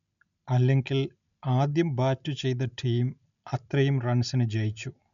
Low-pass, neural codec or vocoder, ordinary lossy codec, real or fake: 7.2 kHz; none; none; real